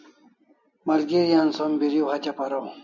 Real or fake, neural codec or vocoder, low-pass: real; none; 7.2 kHz